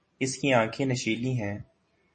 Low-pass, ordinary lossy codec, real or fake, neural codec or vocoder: 10.8 kHz; MP3, 32 kbps; real; none